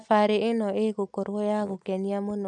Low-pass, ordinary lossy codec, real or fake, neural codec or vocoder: 10.8 kHz; none; real; none